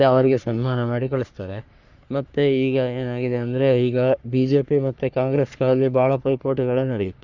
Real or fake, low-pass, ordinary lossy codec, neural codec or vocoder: fake; 7.2 kHz; Opus, 64 kbps; codec, 44.1 kHz, 3.4 kbps, Pupu-Codec